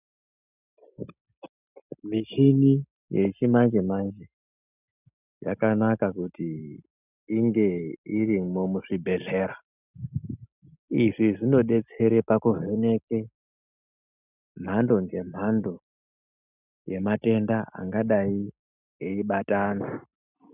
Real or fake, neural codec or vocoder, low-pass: real; none; 3.6 kHz